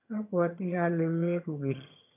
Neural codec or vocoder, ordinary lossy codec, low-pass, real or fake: vocoder, 22.05 kHz, 80 mel bands, HiFi-GAN; none; 3.6 kHz; fake